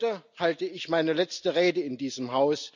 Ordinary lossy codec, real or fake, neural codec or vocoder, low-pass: none; real; none; 7.2 kHz